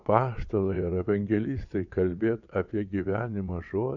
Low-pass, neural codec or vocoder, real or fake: 7.2 kHz; vocoder, 22.05 kHz, 80 mel bands, WaveNeXt; fake